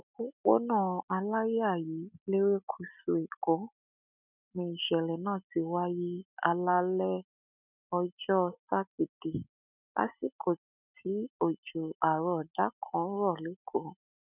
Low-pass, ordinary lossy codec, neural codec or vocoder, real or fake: 3.6 kHz; none; none; real